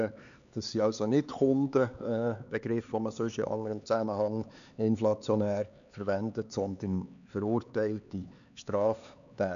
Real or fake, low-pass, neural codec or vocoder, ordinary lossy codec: fake; 7.2 kHz; codec, 16 kHz, 4 kbps, X-Codec, HuBERT features, trained on LibriSpeech; none